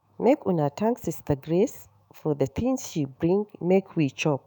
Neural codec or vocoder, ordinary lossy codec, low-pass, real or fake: autoencoder, 48 kHz, 128 numbers a frame, DAC-VAE, trained on Japanese speech; none; none; fake